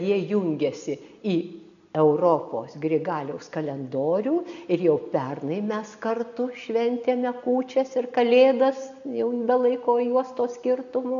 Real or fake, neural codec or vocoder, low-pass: real; none; 7.2 kHz